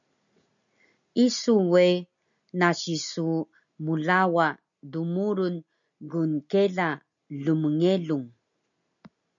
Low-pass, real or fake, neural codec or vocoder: 7.2 kHz; real; none